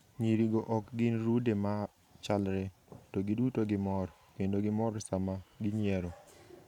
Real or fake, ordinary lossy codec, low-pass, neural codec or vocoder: fake; none; 19.8 kHz; vocoder, 44.1 kHz, 128 mel bands every 512 samples, BigVGAN v2